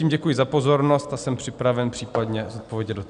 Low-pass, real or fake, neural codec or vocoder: 9.9 kHz; real; none